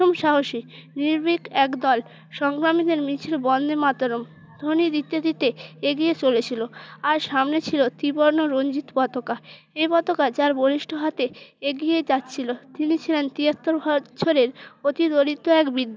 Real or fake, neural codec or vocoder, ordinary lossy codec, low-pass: real; none; none; none